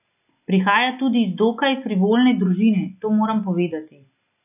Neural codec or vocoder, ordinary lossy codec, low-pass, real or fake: none; none; 3.6 kHz; real